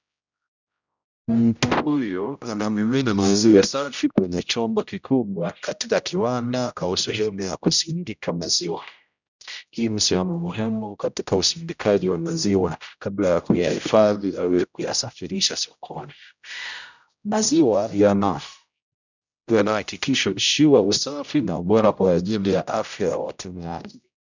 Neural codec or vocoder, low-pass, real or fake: codec, 16 kHz, 0.5 kbps, X-Codec, HuBERT features, trained on general audio; 7.2 kHz; fake